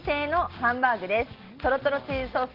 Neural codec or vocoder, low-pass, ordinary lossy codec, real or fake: none; 5.4 kHz; Opus, 16 kbps; real